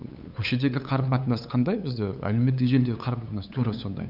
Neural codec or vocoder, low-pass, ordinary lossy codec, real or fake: codec, 16 kHz, 8 kbps, FunCodec, trained on LibriTTS, 25 frames a second; 5.4 kHz; none; fake